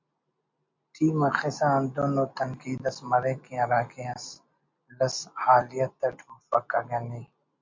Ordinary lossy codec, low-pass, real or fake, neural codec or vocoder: MP3, 48 kbps; 7.2 kHz; real; none